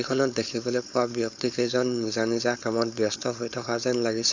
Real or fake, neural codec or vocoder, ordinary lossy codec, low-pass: fake; codec, 16 kHz, 4.8 kbps, FACodec; Opus, 64 kbps; 7.2 kHz